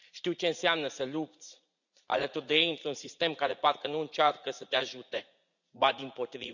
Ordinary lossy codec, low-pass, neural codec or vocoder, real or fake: none; 7.2 kHz; vocoder, 44.1 kHz, 80 mel bands, Vocos; fake